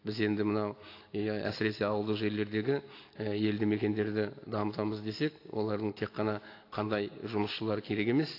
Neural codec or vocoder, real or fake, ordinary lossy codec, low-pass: none; real; AAC, 32 kbps; 5.4 kHz